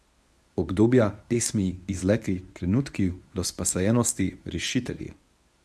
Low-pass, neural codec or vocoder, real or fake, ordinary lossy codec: none; codec, 24 kHz, 0.9 kbps, WavTokenizer, medium speech release version 1; fake; none